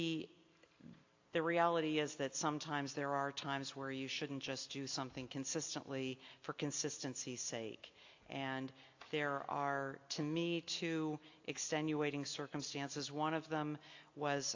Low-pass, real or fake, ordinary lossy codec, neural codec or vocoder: 7.2 kHz; real; AAC, 48 kbps; none